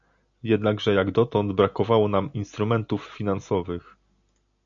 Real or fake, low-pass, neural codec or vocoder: real; 7.2 kHz; none